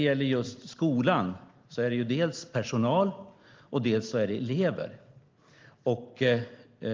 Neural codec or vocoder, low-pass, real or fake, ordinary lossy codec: none; 7.2 kHz; real; Opus, 32 kbps